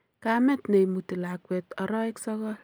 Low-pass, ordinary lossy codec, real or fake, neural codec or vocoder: none; none; real; none